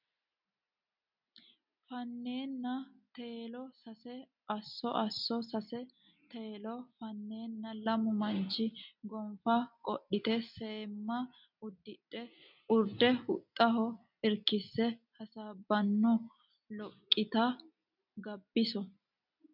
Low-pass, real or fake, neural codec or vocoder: 5.4 kHz; real; none